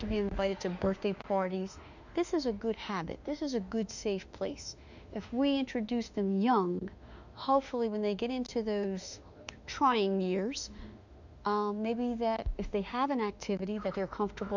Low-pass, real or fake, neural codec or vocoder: 7.2 kHz; fake; autoencoder, 48 kHz, 32 numbers a frame, DAC-VAE, trained on Japanese speech